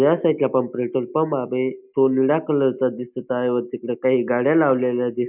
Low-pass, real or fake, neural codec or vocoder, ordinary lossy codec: 3.6 kHz; real; none; none